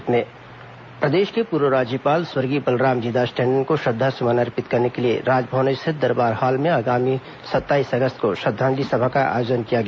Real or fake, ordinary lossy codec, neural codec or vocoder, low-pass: real; none; none; none